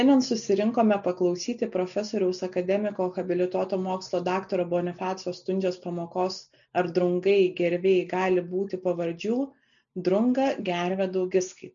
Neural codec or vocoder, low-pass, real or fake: none; 7.2 kHz; real